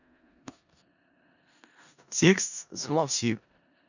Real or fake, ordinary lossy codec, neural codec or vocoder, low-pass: fake; none; codec, 16 kHz in and 24 kHz out, 0.4 kbps, LongCat-Audio-Codec, four codebook decoder; 7.2 kHz